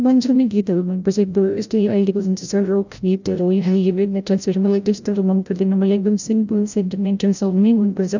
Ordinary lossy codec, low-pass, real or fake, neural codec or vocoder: none; 7.2 kHz; fake; codec, 16 kHz, 0.5 kbps, FreqCodec, larger model